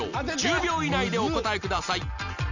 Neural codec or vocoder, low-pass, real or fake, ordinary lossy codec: none; 7.2 kHz; real; none